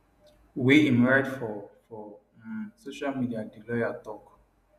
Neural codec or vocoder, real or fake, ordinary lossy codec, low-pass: vocoder, 48 kHz, 128 mel bands, Vocos; fake; none; 14.4 kHz